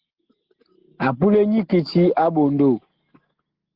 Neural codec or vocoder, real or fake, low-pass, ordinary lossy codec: none; real; 5.4 kHz; Opus, 16 kbps